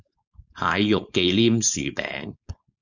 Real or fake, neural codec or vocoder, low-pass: fake; codec, 16 kHz, 4.8 kbps, FACodec; 7.2 kHz